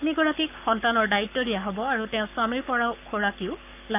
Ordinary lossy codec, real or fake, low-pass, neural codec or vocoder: none; fake; 3.6 kHz; codec, 44.1 kHz, 7.8 kbps, Pupu-Codec